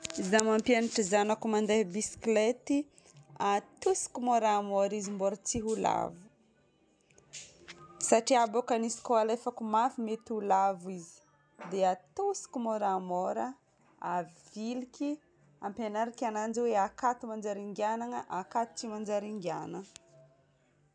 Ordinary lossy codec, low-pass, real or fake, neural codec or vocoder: none; 9.9 kHz; real; none